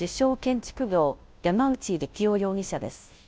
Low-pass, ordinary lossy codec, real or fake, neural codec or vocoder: none; none; fake; codec, 16 kHz, 0.5 kbps, FunCodec, trained on Chinese and English, 25 frames a second